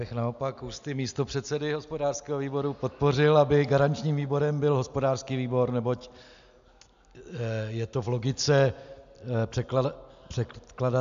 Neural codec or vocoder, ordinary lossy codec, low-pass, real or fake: none; AAC, 96 kbps; 7.2 kHz; real